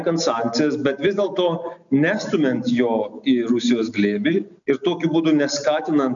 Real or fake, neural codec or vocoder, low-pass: real; none; 7.2 kHz